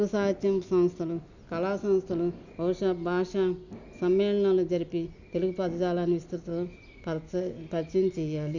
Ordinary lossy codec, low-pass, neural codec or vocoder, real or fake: none; 7.2 kHz; none; real